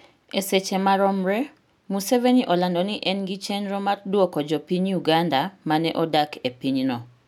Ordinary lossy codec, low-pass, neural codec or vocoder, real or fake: none; 19.8 kHz; none; real